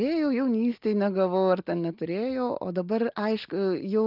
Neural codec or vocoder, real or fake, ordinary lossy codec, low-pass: none; real; Opus, 32 kbps; 5.4 kHz